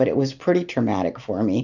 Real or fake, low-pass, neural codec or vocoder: real; 7.2 kHz; none